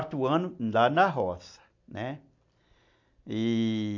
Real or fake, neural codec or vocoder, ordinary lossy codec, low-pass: real; none; AAC, 48 kbps; 7.2 kHz